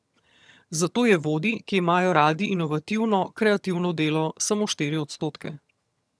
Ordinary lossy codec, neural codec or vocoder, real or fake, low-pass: none; vocoder, 22.05 kHz, 80 mel bands, HiFi-GAN; fake; none